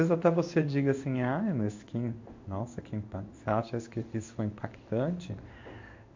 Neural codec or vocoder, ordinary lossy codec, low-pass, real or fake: codec, 16 kHz in and 24 kHz out, 1 kbps, XY-Tokenizer; MP3, 48 kbps; 7.2 kHz; fake